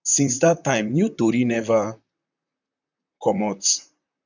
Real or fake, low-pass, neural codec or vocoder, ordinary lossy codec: fake; 7.2 kHz; vocoder, 44.1 kHz, 128 mel bands, Pupu-Vocoder; none